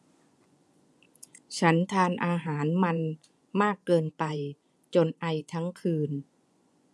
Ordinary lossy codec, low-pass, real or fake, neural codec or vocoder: none; none; fake; vocoder, 24 kHz, 100 mel bands, Vocos